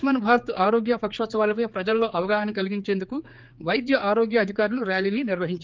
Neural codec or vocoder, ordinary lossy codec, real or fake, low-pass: codec, 16 kHz, 4 kbps, X-Codec, HuBERT features, trained on general audio; Opus, 32 kbps; fake; 7.2 kHz